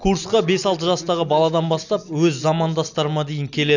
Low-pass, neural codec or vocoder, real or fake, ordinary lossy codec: 7.2 kHz; none; real; none